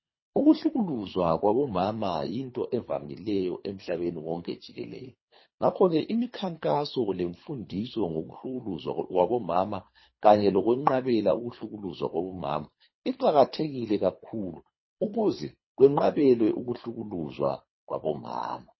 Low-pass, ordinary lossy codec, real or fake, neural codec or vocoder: 7.2 kHz; MP3, 24 kbps; fake; codec, 24 kHz, 3 kbps, HILCodec